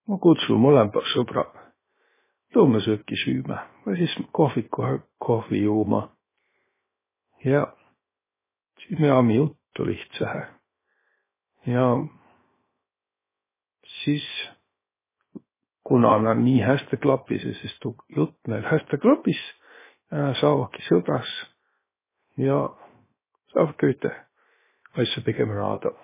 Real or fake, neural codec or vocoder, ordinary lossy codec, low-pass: fake; codec, 16 kHz, 0.7 kbps, FocalCodec; MP3, 16 kbps; 3.6 kHz